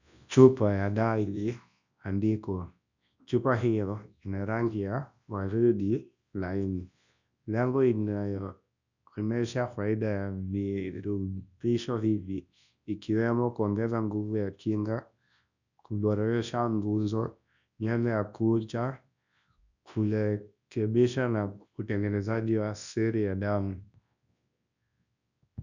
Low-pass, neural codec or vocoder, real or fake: 7.2 kHz; codec, 24 kHz, 0.9 kbps, WavTokenizer, large speech release; fake